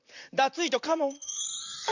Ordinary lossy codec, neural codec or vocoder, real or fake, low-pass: none; vocoder, 44.1 kHz, 128 mel bands, Pupu-Vocoder; fake; 7.2 kHz